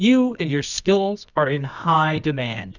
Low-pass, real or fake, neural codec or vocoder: 7.2 kHz; fake; codec, 24 kHz, 0.9 kbps, WavTokenizer, medium music audio release